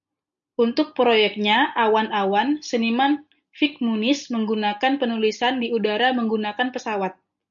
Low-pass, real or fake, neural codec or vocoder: 7.2 kHz; real; none